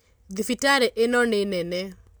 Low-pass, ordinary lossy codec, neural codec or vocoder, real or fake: none; none; none; real